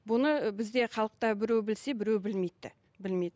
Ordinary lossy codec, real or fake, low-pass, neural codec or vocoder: none; real; none; none